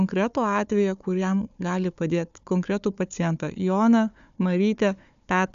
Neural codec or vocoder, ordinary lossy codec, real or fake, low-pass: codec, 16 kHz, 4 kbps, FunCodec, trained on Chinese and English, 50 frames a second; AAC, 96 kbps; fake; 7.2 kHz